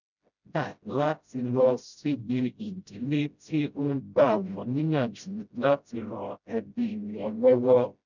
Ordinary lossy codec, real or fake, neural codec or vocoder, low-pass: none; fake; codec, 16 kHz, 0.5 kbps, FreqCodec, smaller model; 7.2 kHz